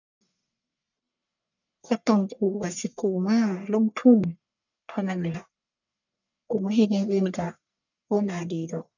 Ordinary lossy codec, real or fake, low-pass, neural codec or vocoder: none; fake; 7.2 kHz; codec, 44.1 kHz, 1.7 kbps, Pupu-Codec